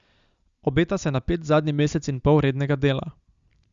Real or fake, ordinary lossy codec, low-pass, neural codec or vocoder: real; Opus, 64 kbps; 7.2 kHz; none